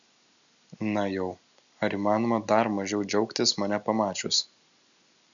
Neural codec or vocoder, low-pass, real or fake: none; 7.2 kHz; real